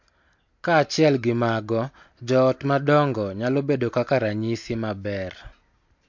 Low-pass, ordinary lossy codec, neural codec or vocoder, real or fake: 7.2 kHz; MP3, 48 kbps; none; real